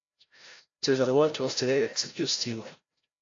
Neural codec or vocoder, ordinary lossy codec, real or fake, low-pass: codec, 16 kHz, 0.5 kbps, FreqCodec, larger model; AAC, 48 kbps; fake; 7.2 kHz